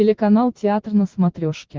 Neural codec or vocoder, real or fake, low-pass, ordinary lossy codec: none; real; 7.2 kHz; Opus, 16 kbps